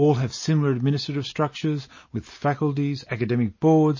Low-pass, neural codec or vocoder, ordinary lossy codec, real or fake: 7.2 kHz; none; MP3, 32 kbps; real